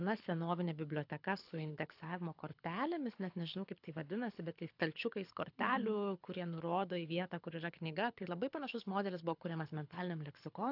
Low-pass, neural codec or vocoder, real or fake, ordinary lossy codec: 5.4 kHz; codec, 24 kHz, 6 kbps, HILCodec; fake; AAC, 48 kbps